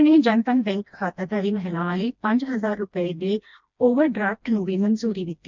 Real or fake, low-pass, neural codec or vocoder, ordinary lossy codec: fake; 7.2 kHz; codec, 16 kHz, 1 kbps, FreqCodec, smaller model; MP3, 64 kbps